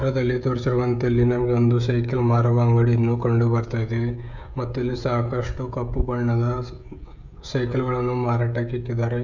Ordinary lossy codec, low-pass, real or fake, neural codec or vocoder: none; 7.2 kHz; fake; codec, 16 kHz, 16 kbps, FreqCodec, smaller model